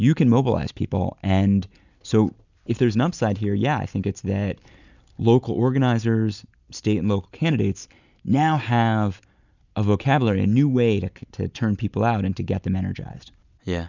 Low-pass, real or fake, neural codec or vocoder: 7.2 kHz; real; none